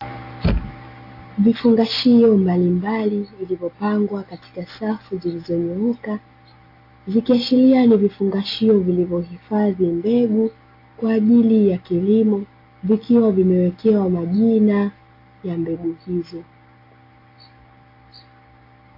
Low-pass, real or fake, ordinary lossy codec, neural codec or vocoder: 5.4 kHz; real; AAC, 32 kbps; none